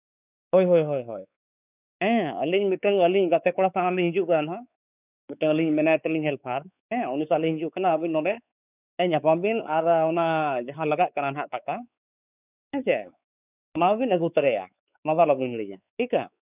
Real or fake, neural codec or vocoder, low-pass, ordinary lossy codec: fake; codec, 16 kHz, 4 kbps, X-Codec, WavLM features, trained on Multilingual LibriSpeech; 3.6 kHz; none